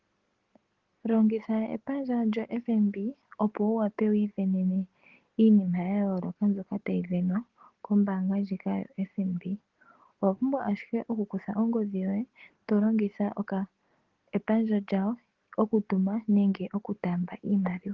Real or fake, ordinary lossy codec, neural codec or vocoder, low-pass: real; Opus, 16 kbps; none; 7.2 kHz